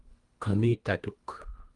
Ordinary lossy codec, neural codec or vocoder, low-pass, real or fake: Opus, 32 kbps; codec, 24 kHz, 1.5 kbps, HILCodec; 10.8 kHz; fake